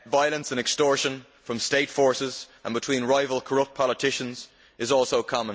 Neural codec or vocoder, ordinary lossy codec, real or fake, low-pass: none; none; real; none